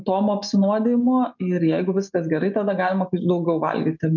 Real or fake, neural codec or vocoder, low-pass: real; none; 7.2 kHz